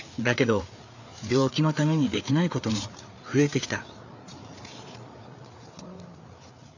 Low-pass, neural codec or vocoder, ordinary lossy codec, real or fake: 7.2 kHz; codec, 16 kHz, 4 kbps, FreqCodec, larger model; none; fake